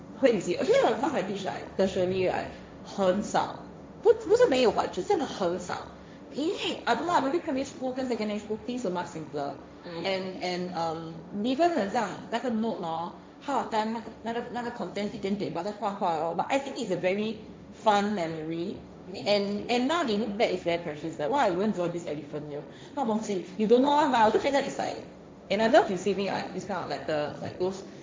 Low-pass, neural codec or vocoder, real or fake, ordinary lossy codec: none; codec, 16 kHz, 1.1 kbps, Voila-Tokenizer; fake; none